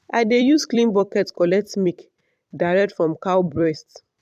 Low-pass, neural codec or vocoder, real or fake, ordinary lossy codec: 14.4 kHz; vocoder, 44.1 kHz, 128 mel bands every 256 samples, BigVGAN v2; fake; MP3, 96 kbps